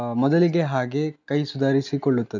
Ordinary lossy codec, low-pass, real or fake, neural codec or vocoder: none; 7.2 kHz; real; none